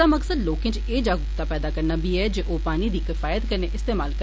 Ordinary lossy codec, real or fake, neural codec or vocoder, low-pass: none; real; none; none